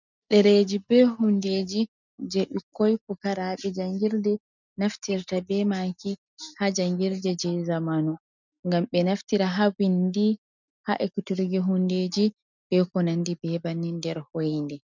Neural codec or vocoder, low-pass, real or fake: none; 7.2 kHz; real